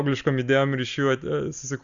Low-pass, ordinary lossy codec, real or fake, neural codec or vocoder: 7.2 kHz; Opus, 64 kbps; real; none